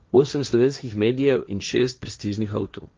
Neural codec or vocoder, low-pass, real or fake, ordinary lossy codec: codec, 16 kHz, 1.1 kbps, Voila-Tokenizer; 7.2 kHz; fake; Opus, 24 kbps